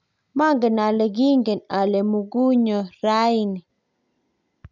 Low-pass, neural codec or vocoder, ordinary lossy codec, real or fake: 7.2 kHz; none; none; real